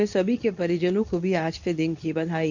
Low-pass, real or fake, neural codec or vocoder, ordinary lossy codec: 7.2 kHz; fake; codec, 24 kHz, 0.9 kbps, WavTokenizer, medium speech release version 2; none